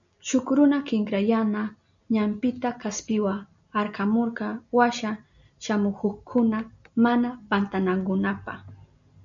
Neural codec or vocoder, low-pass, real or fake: none; 7.2 kHz; real